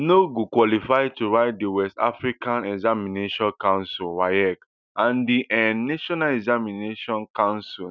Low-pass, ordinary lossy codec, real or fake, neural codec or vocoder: 7.2 kHz; none; real; none